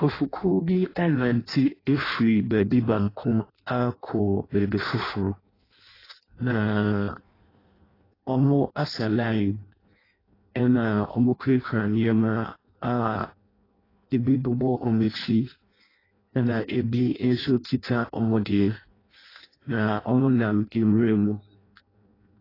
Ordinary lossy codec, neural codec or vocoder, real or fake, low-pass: AAC, 24 kbps; codec, 16 kHz in and 24 kHz out, 0.6 kbps, FireRedTTS-2 codec; fake; 5.4 kHz